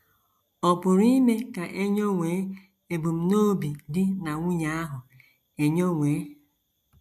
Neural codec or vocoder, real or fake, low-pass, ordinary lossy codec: none; real; 14.4 kHz; MP3, 96 kbps